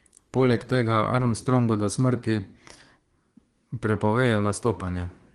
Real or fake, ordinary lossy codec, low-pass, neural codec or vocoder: fake; Opus, 24 kbps; 10.8 kHz; codec, 24 kHz, 1 kbps, SNAC